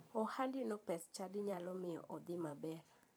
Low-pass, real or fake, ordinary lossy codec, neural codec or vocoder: none; fake; none; vocoder, 44.1 kHz, 128 mel bands every 512 samples, BigVGAN v2